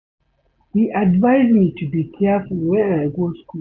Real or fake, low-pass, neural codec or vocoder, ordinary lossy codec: real; 7.2 kHz; none; none